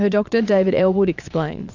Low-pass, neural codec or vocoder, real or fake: 7.2 kHz; vocoder, 22.05 kHz, 80 mel bands, Vocos; fake